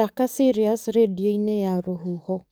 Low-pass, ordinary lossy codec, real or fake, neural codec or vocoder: none; none; fake; codec, 44.1 kHz, 7.8 kbps, DAC